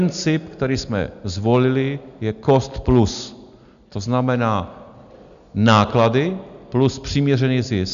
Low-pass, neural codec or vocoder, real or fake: 7.2 kHz; none; real